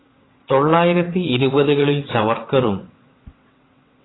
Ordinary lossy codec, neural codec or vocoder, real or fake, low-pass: AAC, 16 kbps; vocoder, 22.05 kHz, 80 mel bands, WaveNeXt; fake; 7.2 kHz